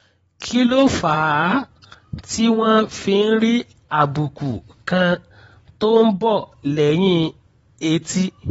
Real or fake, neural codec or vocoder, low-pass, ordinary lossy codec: fake; vocoder, 24 kHz, 100 mel bands, Vocos; 10.8 kHz; AAC, 24 kbps